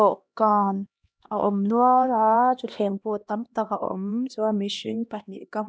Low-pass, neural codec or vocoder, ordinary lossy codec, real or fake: none; codec, 16 kHz, 1 kbps, X-Codec, HuBERT features, trained on LibriSpeech; none; fake